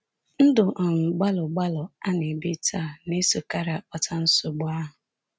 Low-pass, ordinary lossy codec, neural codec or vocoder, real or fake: none; none; none; real